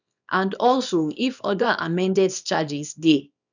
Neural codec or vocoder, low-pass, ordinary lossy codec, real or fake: codec, 24 kHz, 0.9 kbps, WavTokenizer, small release; 7.2 kHz; none; fake